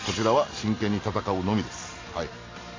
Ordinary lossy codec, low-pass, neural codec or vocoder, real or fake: AAC, 32 kbps; 7.2 kHz; none; real